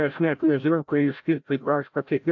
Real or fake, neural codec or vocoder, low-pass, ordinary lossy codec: fake; codec, 16 kHz, 0.5 kbps, FreqCodec, larger model; 7.2 kHz; AAC, 48 kbps